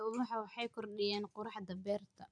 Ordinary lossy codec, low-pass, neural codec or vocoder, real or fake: MP3, 96 kbps; 9.9 kHz; none; real